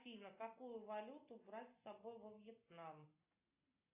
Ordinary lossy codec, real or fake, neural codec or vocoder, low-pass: AAC, 32 kbps; real; none; 3.6 kHz